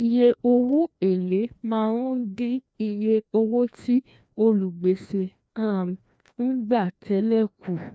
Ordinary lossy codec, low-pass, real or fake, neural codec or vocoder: none; none; fake; codec, 16 kHz, 1 kbps, FreqCodec, larger model